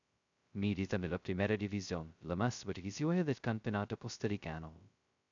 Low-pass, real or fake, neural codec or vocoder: 7.2 kHz; fake; codec, 16 kHz, 0.2 kbps, FocalCodec